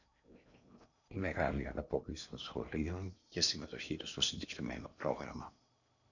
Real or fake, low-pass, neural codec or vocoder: fake; 7.2 kHz; codec, 16 kHz in and 24 kHz out, 0.8 kbps, FocalCodec, streaming, 65536 codes